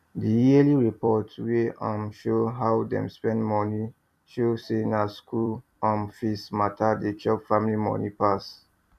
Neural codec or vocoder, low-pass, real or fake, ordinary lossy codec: vocoder, 44.1 kHz, 128 mel bands every 256 samples, BigVGAN v2; 14.4 kHz; fake; MP3, 96 kbps